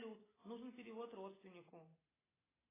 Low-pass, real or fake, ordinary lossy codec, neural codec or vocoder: 3.6 kHz; real; AAC, 16 kbps; none